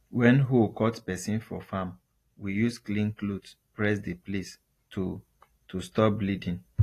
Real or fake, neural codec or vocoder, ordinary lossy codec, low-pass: real; none; AAC, 48 kbps; 14.4 kHz